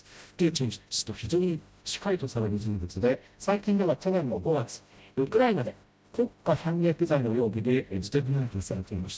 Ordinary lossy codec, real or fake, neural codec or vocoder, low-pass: none; fake; codec, 16 kHz, 0.5 kbps, FreqCodec, smaller model; none